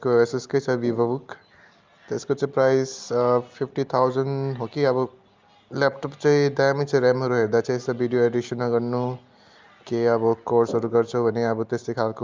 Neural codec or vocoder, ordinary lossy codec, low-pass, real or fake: none; Opus, 24 kbps; 7.2 kHz; real